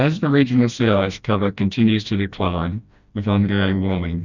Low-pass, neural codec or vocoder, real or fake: 7.2 kHz; codec, 16 kHz, 1 kbps, FreqCodec, smaller model; fake